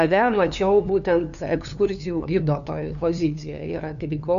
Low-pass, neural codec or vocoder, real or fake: 7.2 kHz; codec, 16 kHz, 2 kbps, FunCodec, trained on LibriTTS, 25 frames a second; fake